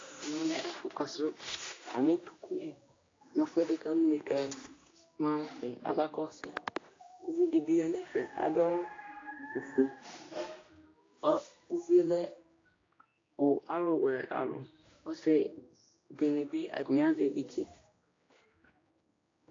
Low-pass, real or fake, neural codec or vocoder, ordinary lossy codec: 7.2 kHz; fake; codec, 16 kHz, 1 kbps, X-Codec, HuBERT features, trained on general audio; AAC, 32 kbps